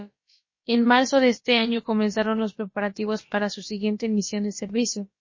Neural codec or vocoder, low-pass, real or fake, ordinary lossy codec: codec, 16 kHz, about 1 kbps, DyCAST, with the encoder's durations; 7.2 kHz; fake; MP3, 32 kbps